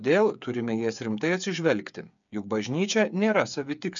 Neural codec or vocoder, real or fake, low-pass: codec, 16 kHz, 8 kbps, FreqCodec, smaller model; fake; 7.2 kHz